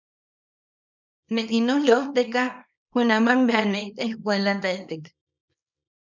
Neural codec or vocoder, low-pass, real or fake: codec, 24 kHz, 0.9 kbps, WavTokenizer, small release; 7.2 kHz; fake